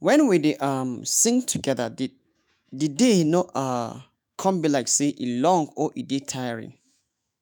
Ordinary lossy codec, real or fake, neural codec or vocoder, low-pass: none; fake; autoencoder, 48 kHz, 128 numbers a frame, DAC-VAE, trained on Japanese speech; none